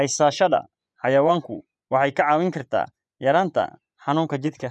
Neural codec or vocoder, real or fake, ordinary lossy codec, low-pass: vocoder, 24 kHz, 100 mel bands, Vocos; fake; none; none